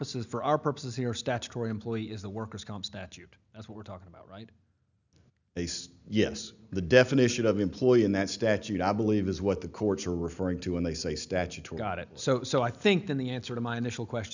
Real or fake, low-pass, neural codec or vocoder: real; 7.2 kHz; none